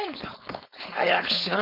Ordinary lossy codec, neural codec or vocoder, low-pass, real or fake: none; codec, 16 kHz, 4.8 kbps, FACodec; 5.4 kHz; fake